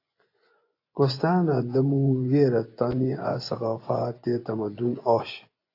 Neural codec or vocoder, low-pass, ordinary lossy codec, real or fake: vocoder, 24 kHz, 100 mel bands, Vocos; 5.4 kHz; AAC, 32 kbps; fake